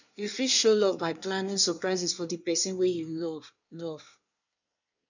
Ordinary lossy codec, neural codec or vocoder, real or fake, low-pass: none; codec, 24 kHz, 1 kbps, SNAC; fake; 7.2 kHz